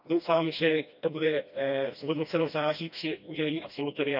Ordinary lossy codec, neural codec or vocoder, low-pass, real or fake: none; codec, 16 kHz, 1 kbps, FreqCodec, smaller model; 5.4 kHz; fake